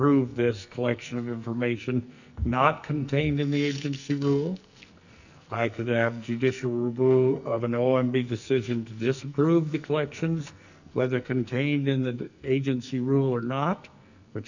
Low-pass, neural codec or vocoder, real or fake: 7.2 kHz; codec, 44.1 kHz, 2.6 kbps, SNAC; fake